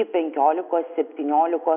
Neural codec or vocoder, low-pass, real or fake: none; 3.6 kHz; real